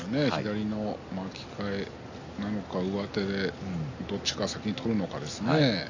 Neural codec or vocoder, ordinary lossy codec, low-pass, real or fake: none; AAC, 48 kbps; 7.2 kHz; real